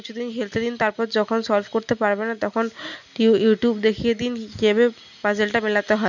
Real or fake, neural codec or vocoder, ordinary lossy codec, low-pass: real; none; none; 7.2 kHz